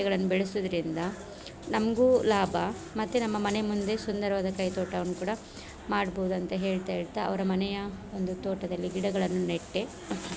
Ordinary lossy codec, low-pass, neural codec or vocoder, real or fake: none; none; none; real